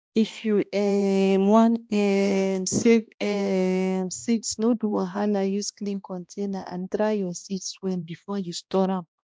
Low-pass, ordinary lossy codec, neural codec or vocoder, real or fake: none; none; codec, 16 kHz, 1 kbps, X-Codec, HuBERT features, trained on balanced general audio; fake